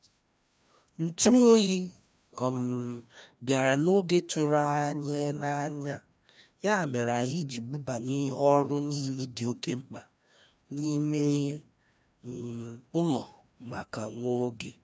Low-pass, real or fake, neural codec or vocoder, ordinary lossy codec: none; fake; codec, 16 kHz, 1 kbps, FreqCodec, larger model; none